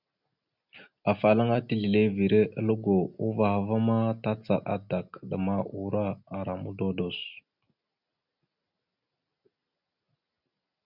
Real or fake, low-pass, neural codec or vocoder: real; 5.4 kHz; none